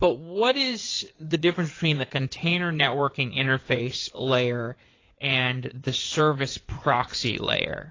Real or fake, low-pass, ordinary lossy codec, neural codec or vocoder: fake; 7.2 kHz; AAC, 32 kbps; codec, 16 kHz in and 24 kHz out, 2.2 kbps, FireRedTTS-2 codec